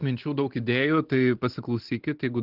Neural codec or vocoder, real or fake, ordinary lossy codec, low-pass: none; real; Opus, 16 kbps; 5.4 kHz